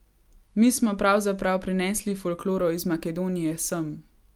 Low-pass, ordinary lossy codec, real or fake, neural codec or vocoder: 19.8 kHz; Opus, 32 kbps; real; none